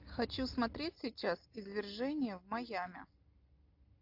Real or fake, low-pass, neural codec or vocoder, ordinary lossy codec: real; 5.4 kHz; none; AAC, 48 kbps